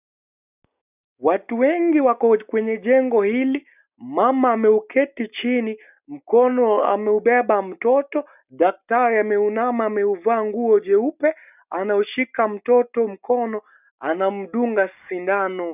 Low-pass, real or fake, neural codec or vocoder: 3.6 kHz; real; none